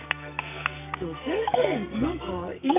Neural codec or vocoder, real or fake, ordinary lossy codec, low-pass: codec, 44.1 kHz, 2.6 kbps, SNAC; fake; none; 3.6 kHz